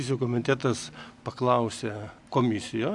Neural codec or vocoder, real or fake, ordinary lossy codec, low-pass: none; real; AAC, 64 kbps; 10.8 kHz